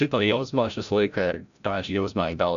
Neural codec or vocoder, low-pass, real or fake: codec, 16 kHz, 0.5 kbps, FreqCodec, larger model; 7.2 kHz; fake